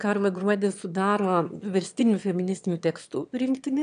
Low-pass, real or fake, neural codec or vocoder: 9.9 kHz; fake; autoencoder, 22.05 kHz, a latent of 192 numbers a frame, VITS, trained on one speaker